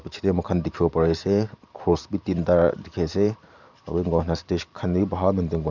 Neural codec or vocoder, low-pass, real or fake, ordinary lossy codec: vocoder, 44.1 kHz, 128 mel bands every 512 samples, BigVGAN v2; 7.2 kHz; fake; none